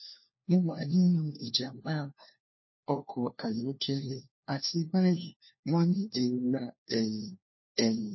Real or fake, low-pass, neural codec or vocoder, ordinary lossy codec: fake; 7.2 kHz; codec, 16 kHz, 1 kbps, FunCodec, trained on LibriTTS, 50 frames a second; MP3, 24 kbps